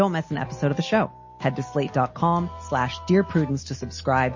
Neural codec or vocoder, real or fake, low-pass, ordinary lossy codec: none; real; 7.2 kHz; MP3, 32 kbps